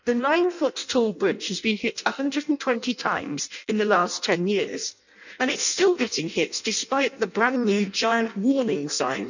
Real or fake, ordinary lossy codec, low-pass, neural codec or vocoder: fake; none; 7.2 kHz; codec, 16 kHz in and 24 kHz out, 0.6 kbps, FireRedTTS-2 codec